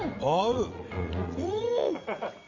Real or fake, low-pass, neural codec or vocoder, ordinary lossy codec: fake; 7.2 kHz; vocoder, 44.1 kHz, 80 mel bands, Vocos; none